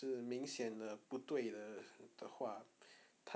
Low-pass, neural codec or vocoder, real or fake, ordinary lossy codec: none; none; real; none